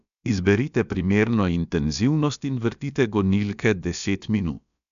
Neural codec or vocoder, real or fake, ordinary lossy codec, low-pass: codec, 16 kHz, about 1 kbps, DyCAST, with the encoder's durations; fake; none; 7.2 kHz